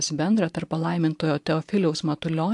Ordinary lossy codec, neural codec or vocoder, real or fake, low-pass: AAC, 64 kbps; vocoder, 44.1 kHz, 128 mel bands every 512 samples, BigVGAN v2; fake; 10.8 kHz